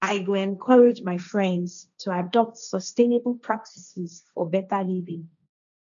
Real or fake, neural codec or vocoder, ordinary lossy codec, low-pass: fake; codec, 16 kHz, 1.1 kbps, Voila-Tokenizer; none; 7.2 kHz